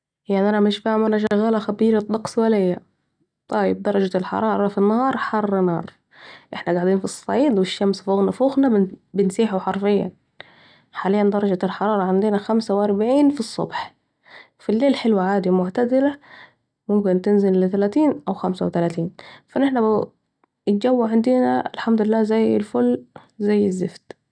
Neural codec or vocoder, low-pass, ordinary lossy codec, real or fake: none; 9.9 kHz; none; real